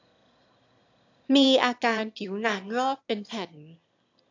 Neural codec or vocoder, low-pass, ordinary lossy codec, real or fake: autoencoder, 22.05 kHz, a latent of 192 numbers a frame, VITS, trained on one speaker; 7.2 kHz; AAC, 48 kbps; fake